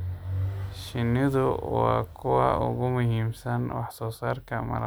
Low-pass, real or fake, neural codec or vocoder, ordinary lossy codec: none; real; none; none